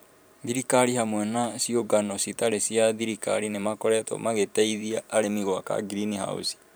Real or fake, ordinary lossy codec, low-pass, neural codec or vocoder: fake; none; none; vocoder, 44.1 kHz, 128 mel bands every 512 samples, BigVGAN v2